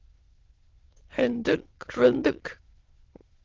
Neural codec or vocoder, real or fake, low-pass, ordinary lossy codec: autoencoder, 22.05 kHz, a latent of 192 numbers a frame, VITS, trained on many speakers; fake; 7.2 kHz; Opus, 16 kbps